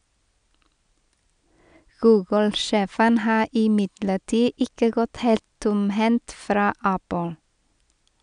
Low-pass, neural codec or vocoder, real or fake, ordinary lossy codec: 9.9 kHz; none; real; none